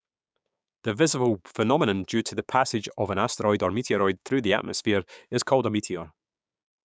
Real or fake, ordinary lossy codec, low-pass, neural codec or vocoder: fake; none; none; codec, 16 kHz, 6 kbps, DAC